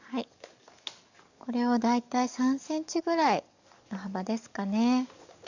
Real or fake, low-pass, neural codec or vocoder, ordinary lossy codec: fake; 7.2 kHz; codec, 44.1 kHz, 7.8 kbps, DAC; none